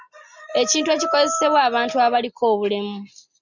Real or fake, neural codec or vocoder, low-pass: real; none; 7.2 kHz